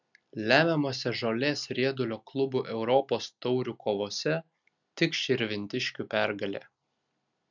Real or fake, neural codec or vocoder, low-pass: real; none; 7.2 kHz